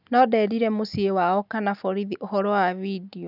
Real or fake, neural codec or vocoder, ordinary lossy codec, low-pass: real; none; none; 5.4 kHz